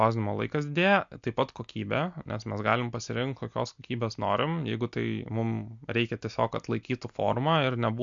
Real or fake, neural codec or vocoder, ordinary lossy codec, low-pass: real; none; MP3, 64 kbps; 7.2 kHz